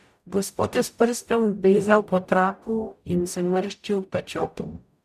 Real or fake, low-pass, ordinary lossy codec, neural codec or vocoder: fake; 14.4 kHz; none; codec, 44.1 kHz, 0.9 kbps, DAC